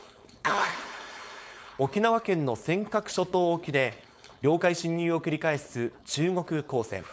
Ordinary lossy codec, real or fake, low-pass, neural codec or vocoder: none; fake; none; codec, 16 kHz, 4.8 kbps, FACodec